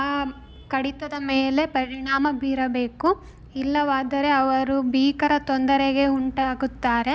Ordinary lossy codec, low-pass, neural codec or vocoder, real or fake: none; none; none; real